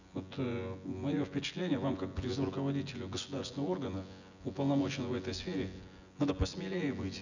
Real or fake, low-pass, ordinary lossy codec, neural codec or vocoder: fake; 7.2 kHz; none; vocoder, 24 kHz, 100 mel bands, Vocos